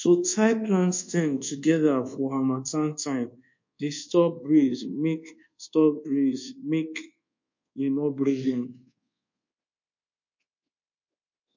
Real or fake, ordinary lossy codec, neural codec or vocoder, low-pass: fake; MP3, 48 kbps; codec, 24 kHz, 1.2 kbps, DualCodec; 7.2 kHz